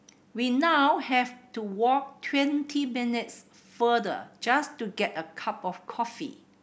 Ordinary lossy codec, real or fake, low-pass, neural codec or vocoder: none; real; none; none